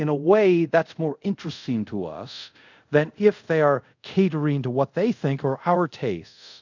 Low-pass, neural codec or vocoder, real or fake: 7.2 kHz; codec, 24 kHz, 0.5 kbps, DualCodec; fake